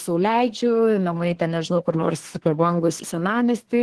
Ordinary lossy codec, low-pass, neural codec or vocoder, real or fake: Opus, 16 kbps; 10.8 kHz; codec, 24 kHz, 1 kbps, SNAC; fake